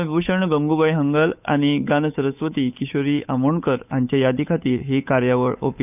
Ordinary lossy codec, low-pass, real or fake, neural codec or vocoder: none; 3.6 kHz; fake; codec, 16 kHz, 8 kbps, FunCodec, trained on Chinese and English, 25 frames a second